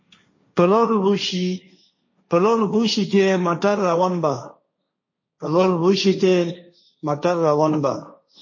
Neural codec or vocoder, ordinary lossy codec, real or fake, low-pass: codec, 16 kHz, 1.1 kbps, Voila-Tokenizer; MP3, 32 kbps; fake; 7.2 kHz